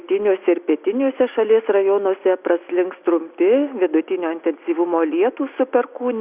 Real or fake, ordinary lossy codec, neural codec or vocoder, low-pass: real; Opus, 64 kbps; none; 3.6 kHz